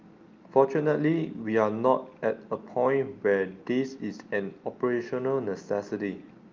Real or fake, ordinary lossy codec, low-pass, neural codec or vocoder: real; Opus, 24 kbps; 7.2 kHz; none